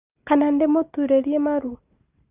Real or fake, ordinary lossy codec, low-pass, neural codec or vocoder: real; Opus, 32 kbps; 3.6 kHz; none